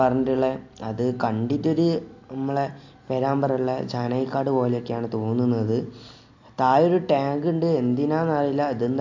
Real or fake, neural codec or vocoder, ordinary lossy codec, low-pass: real; none; AAC, 48 kbps; 7.2 kHz